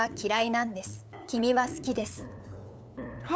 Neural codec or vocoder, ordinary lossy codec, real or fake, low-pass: codec, 16 kHz, 8 kbps, FunCodec, trained on LibriTTS, 25 frames a second; none; fake; none